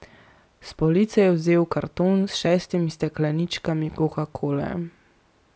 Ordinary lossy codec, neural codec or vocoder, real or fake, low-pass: none; none; real; none